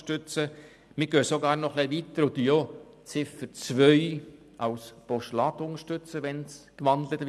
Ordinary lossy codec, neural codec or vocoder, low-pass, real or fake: none; none; none; real